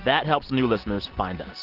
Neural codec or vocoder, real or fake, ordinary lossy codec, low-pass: none; real; Opus, 16 kbps; 5.4 kHz